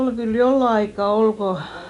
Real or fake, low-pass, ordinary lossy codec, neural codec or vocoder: real; 10.8 kHz; none; none